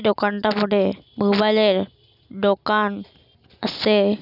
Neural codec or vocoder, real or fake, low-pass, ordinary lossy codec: none; real; 5.4 kHz; none